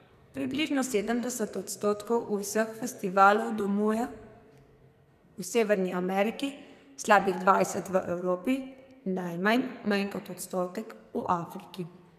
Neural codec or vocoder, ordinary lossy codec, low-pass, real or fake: codec, 44.1 kHz, 2.6 kbps, SNAC; none; 14.4 kHz; fake